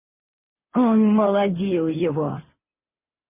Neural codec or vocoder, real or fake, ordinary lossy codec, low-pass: none; real; MP3, 32 kbps; 3.6 kHz